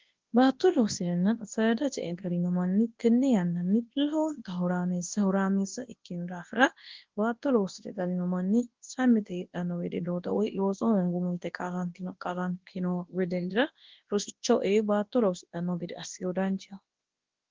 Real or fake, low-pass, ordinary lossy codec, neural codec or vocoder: fake; 7.2 kHz; Opus, 16 kbps; codec, 24 kHz, 0.9 kbps, WavTokenizer, large speech release